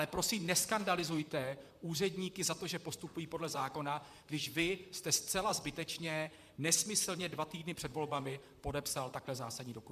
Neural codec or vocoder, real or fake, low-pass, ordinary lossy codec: vocoder, 44.1 kHz, 128 mel bands, Pupu-Vocoder; fake; 14.4 kHz; MP3, 96 kbps